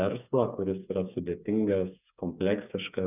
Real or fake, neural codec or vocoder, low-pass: fake; codec, 16 kHz, 4 kbps, FreqCodec, smaller model; 3.6 kHz